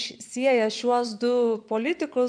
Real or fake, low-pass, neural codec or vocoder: fake; 9.9 kHz; vocoder, 22.05 kHz, 80 mel bands, Vocos